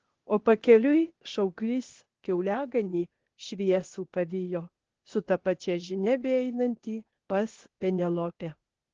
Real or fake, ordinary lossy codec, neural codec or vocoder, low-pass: fake; Opus, 16 kbps; codec, 16 kHz, 0.8 kbps, ZipCodec; 7.2 kHz